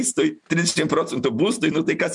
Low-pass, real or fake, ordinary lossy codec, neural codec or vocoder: 10.8 kHz; real; MP3, 96 kbps; none